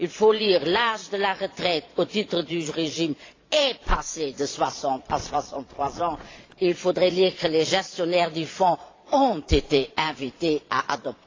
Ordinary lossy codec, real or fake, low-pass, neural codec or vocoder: AAC, 32 kbps; fake; 7.2 kHz; vocoder, 22.05 kHz, 80 mel bands, Vocos